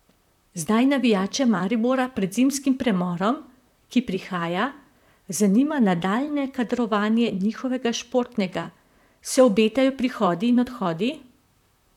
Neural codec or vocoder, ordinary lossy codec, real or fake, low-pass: vocoder, 44.1 kHz, 128 mel bands, Pupu-Vocoder; none; fake; 19.8 kHz